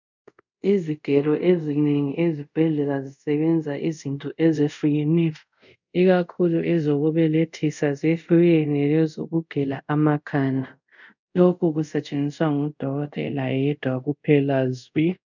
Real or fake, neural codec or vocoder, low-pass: fake; codec, 24 kHz, 0.5 kbps, DualCodec; 7.2 kHz